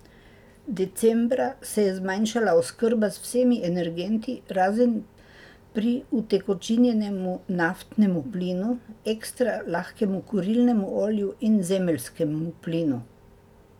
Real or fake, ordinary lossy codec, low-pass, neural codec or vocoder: real; none; 19.8 kHz; none